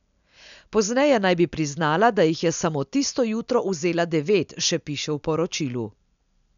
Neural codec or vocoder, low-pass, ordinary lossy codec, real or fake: none; 7.2 kHz; none; real